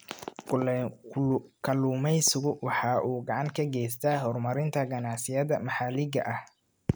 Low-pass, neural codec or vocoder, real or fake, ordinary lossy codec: none; none; real; none